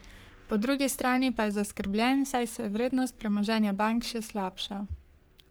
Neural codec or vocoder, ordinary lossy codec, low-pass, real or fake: codec, 44.1 kHz, 3.4 kbps, Pupu-Codec; none; none; fake